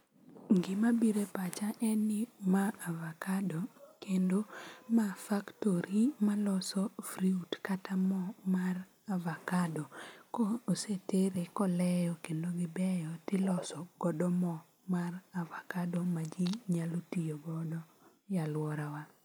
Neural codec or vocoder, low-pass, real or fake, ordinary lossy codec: none; none; real; none